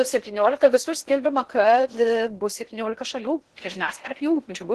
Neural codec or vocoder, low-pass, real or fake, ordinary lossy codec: codec, 16 kHz in and 24 kHz out, 0.6 kbps, FocalCodec, streaming, 4096 codes; 10.8 kHz; fake; Opus, 16 kbps